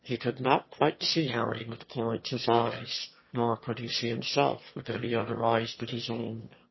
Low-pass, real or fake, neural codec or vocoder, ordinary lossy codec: 7.2 kHz; fake; autoencoder, 22.05 kHz, a latent of 192 numbers a frame, VITS, trained on one speaker; MP3, 24 kbps